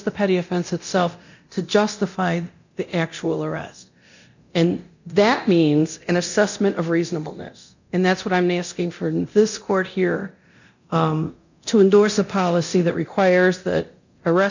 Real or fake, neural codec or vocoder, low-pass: fake; codec, 24 kHz, 0.9 kbps, DualCodec; 7.2 kHz